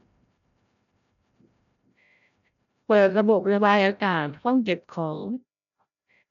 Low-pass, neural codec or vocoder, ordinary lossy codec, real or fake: 7.2 kHz; codec, 16 kHz, 0.5 kbps, FreqCodec, larger model; MP3, 96 kbps; fake